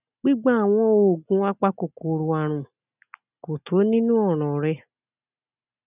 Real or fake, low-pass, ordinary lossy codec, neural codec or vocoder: real; 3.6 kHz; none; none